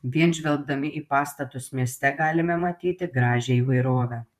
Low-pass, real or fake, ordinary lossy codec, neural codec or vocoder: 14.4 kHz; fake; MP3, 96 kbps; vocoder, 44.1 kHz, 128 mel bands, Pupu-Vocoder